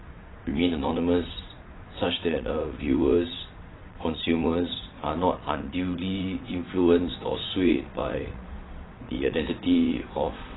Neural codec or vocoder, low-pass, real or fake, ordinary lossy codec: vocoder, 22.05 kHz, 80 mel bands, WaveNeXt; 7.2 kHz; fake; AAC, 16 kbps